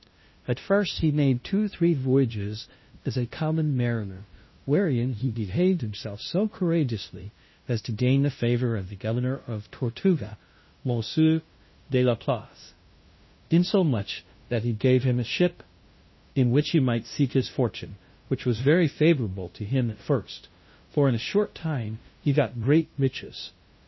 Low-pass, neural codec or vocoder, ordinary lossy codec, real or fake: 7.2 kHz; codec, 16 kHz, 0.5 kbps, FunCodec, trained on LibriTTS, 25 frames a second; MP3, 24 kbps; fake